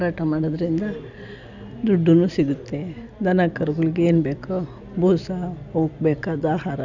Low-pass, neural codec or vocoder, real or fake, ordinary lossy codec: 7.2 kHz; none; real; none